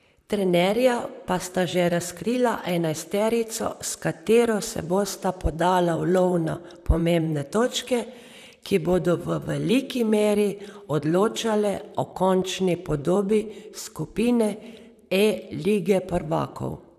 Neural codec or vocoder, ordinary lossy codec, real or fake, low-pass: vocoder, 44.1 kHz, 128 mel bands, Pupu-Vocoder; none; fake; 14.4 kHz